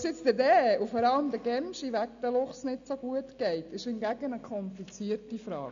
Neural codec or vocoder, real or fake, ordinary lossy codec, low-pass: none; real; AAC, 96 kbps; 7.2 kHz